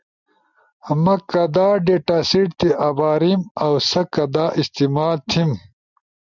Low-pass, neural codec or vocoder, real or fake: 7.2 kHz; none; real